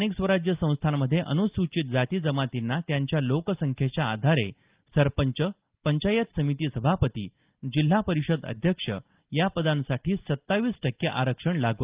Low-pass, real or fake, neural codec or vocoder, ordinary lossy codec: 3.6 kHz; real; none; Opus, 32 kbps